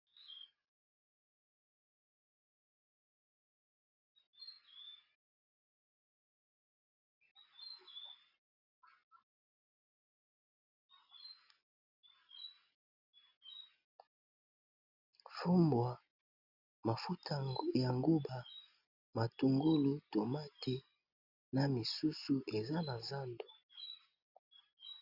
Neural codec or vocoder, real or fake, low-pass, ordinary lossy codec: none; real; 5.4 kHz; Opus, 64 kbps